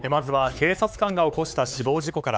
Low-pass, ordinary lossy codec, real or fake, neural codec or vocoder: none; none; fake; codec, 16 kHz, 4 kbps, X-Codec, HuBERT features, trained on LibriSpeech